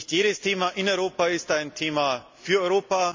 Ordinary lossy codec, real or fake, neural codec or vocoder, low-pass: MP3, 64 kbps; real; none; 7.2 kHz